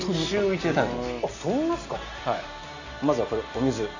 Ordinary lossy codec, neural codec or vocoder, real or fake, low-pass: none; none; real; 7.2 kHz